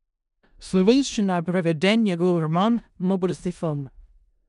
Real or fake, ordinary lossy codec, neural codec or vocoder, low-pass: fake; none; codec, 16 kHz in and 24 kHz out, 0.4 kbps, LongCat-Audio-Codec, four codebook decoder; 10.8 kHz